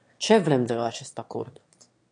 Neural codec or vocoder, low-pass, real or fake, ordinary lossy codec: autoencoder, 22.05 kHz, a latent of 192 numbers a frame, VITS, trained on one speaker; 9.9 kHz; fake; AAC, 48 kbps